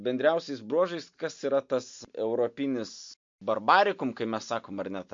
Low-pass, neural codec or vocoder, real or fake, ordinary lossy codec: 7.2 kHz; none; real; MP3, 48 kbps